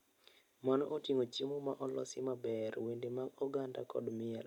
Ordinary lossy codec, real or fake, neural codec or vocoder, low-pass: MP3, 96 kbps; real; none; 19.8 kHz